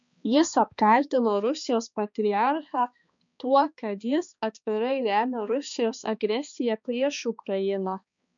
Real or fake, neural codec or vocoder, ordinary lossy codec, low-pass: fake; codec, 16 kHz, 2 kbps, X-Codec, HuBERT features, trained on balanced general audio; MP3, 64 kbps; 7.2 kHz